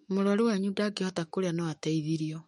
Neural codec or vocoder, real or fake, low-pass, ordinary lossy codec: autoencoder, 48 kHz, 128 numbers a frame, DAC-VAE, trained on Japanese speech; fake; 19.8 kHz; MP3, 48 kbps